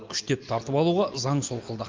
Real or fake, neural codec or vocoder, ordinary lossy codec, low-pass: real; none; Opus, 24 kbps; 7.2 kHz